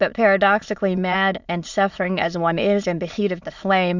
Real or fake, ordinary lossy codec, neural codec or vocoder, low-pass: fake; Opus, 64 kbps; autoencoder, 22.05 kHz, a latent of 192 numbers a frame, VITS, trained on many speakers; 7.2 kHz